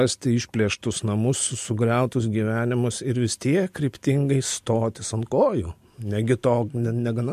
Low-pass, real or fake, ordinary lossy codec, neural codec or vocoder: 14.4 kHz; real; MP3, 64 kbps; none